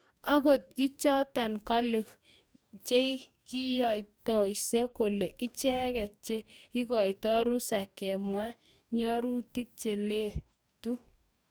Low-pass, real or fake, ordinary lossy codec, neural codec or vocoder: none; fake; none; codec, 44.1 kHz, 2.6 kbps, DAC